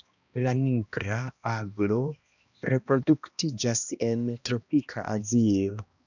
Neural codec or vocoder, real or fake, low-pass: codec, 16 kHz, 1 kbps, X-Codec, HuBERT features, trained on balanced general audio; fake; 7.2 kHz